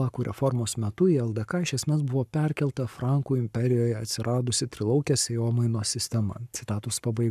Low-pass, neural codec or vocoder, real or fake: 14.4 kHz; codec, 44.1 kHz, 7.8 kbps, Pupu-Codec; fake